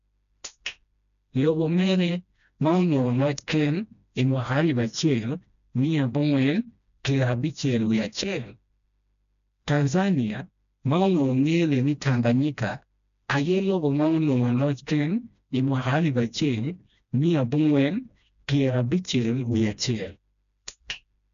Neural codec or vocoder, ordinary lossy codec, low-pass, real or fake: codec, 16 kHz, 1 kbps, FreqCodec, smaller model; none; 7.2 kHz; fake